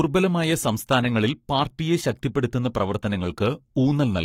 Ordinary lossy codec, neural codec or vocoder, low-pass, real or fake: AAC, 48 kbps; codec, 44.1 kHz, 7.8 kbps, Pupu-Codec; 19.8 kHz; fake